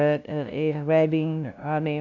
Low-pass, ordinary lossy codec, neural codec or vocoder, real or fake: 7.2 kHz; none; codec, 16 kHz, 0.5 kbps, FunCodec, trained on LibriTTS, 25 frames a second; fake